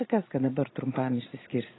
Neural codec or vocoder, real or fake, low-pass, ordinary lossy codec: none; real; 7.2 kHz; AAC, 16 kbps